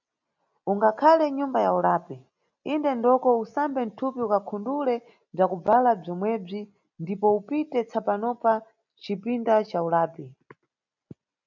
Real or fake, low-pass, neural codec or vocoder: real; 7.2 kHz; none